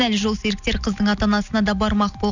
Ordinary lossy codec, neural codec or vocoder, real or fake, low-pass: none; none; real; 7.2 kHz